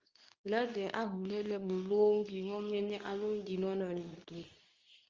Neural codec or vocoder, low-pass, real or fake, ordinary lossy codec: codec, 24 kHz, 0.9 kbps, WavTokenizer, medium speech release version 2; 7.2 kHz; fake; Opus, 32 kbps